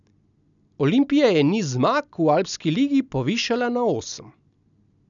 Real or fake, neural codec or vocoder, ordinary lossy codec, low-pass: real; none; none; 7.2 kHz